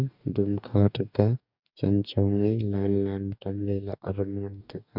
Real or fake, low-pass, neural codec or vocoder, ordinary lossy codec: fake; 5.4 kHz; codec, 44.1 kHz, 2.6 kbps, DAC; none